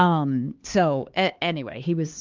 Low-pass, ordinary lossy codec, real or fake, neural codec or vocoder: 7.2 kHz; Opus, 32 kbps; fake; codec, 16 kHz, 2 kbps, X-Codec, HuBERT features, trained on LibriSpeech